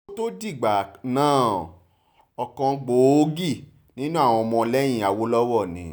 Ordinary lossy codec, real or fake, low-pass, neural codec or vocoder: none; real; none; none